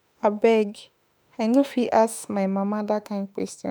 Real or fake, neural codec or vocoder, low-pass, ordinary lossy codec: fake; autoencoder, 48 kHz, 32 numbers a frame, DAC-VAE, trained on Japanese speech; none; none